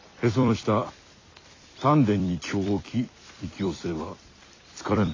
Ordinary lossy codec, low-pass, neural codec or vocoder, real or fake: AAC, 32 kbps; 7.2 kHz; vocoder, 44.1 kHz, 128 mel bands every 256 samples, BigVGAN v2; fake